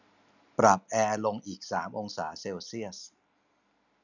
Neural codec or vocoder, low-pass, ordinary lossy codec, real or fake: none; 7.2 kHz; none; real